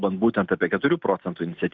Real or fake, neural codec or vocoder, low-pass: real; none; 7.2 kHz